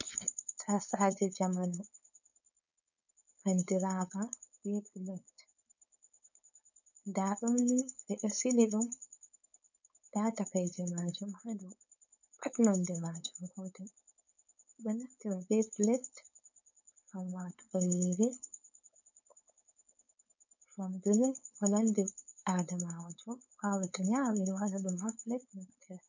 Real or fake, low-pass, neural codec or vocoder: fake; 7.2 kHz; codec, 16 kHz, 4.8 kbps, FACodec